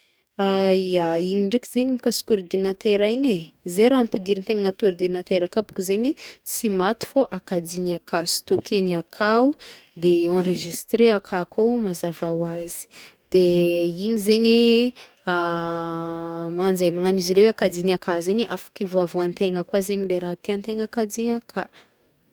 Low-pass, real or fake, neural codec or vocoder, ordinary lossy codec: none; fake; codec, 44.1 kHz, 2.6 kbps, DAC; none